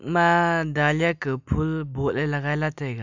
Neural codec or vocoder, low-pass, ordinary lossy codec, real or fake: none; 7.2 kHz; none; real